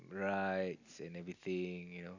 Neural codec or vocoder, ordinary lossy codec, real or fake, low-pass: none; none; real; 7.2 kHz